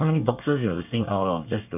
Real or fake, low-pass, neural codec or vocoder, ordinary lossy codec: fake; 3.6 kHz; codec, 24 kHz, 1 kbps, SNAC; none